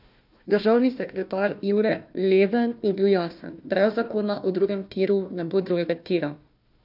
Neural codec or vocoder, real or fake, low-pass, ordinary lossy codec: codec, 16 kHz, 1 kbps, FunCodec, trained on Chinese and English, 50 frames a second; fake; 5.4 kHz; none